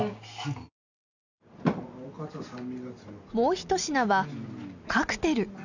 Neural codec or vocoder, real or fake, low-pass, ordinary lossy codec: none; real; 7.2 kHz; none